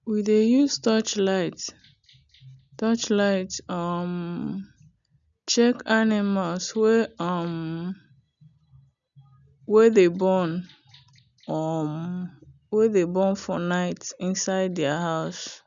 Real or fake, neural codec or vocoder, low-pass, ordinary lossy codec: real; none; 7.2 kHz; none